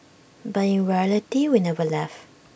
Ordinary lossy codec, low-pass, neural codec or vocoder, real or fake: none; none; none; real